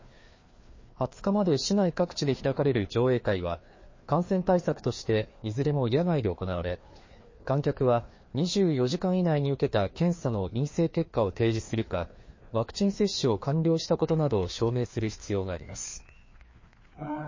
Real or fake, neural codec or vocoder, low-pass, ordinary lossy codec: fake; codec, 16 kHz, 2 kbps, FreqCodec, larger model; 7.2 kHz; MP3, 32 kbps